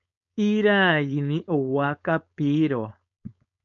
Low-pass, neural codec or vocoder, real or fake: 7.2 kHz; codec, 16 kHz, 4.8 kbps, FACodec; fake